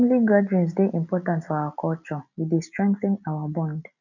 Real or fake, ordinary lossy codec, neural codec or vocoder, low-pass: real; none; none; 7.2 kHz